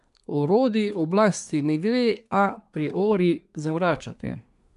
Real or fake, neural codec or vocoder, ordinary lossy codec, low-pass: fake; codec, 24 kHz, 1 kbps, SNAC; none; 10.8 kHz